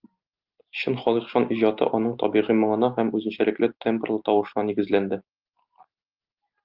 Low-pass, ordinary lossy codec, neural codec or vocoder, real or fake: 5.4 kHz; Opus, 24 kbps; none; real